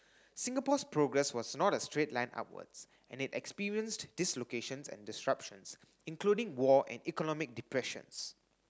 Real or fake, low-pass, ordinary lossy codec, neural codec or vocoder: real; none; none; none